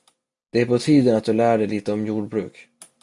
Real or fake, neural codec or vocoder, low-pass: real; none; 10.8 kHz